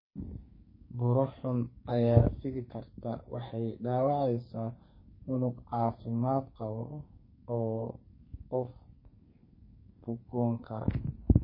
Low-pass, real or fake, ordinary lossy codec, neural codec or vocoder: 5.4 kHz; fake; MP3, 24 kbps; codec, 44.1 kHz, 2.6 kbps, SNAC